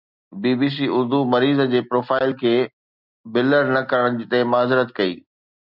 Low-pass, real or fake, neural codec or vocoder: 5.4 kHz; real; none